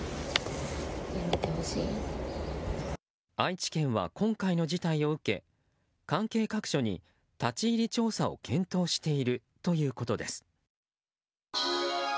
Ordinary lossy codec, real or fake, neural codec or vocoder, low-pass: none; real; none; none